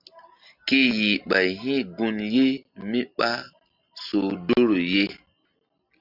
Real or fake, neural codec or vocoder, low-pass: real; none; 5.4 kHz